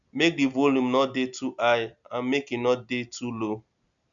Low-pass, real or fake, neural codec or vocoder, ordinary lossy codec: 7.2 kHz; real; none; none